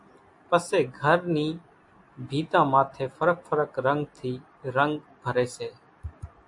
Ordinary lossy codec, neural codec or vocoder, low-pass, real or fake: AAC, 64 kbps; none; 10.8 kHz; real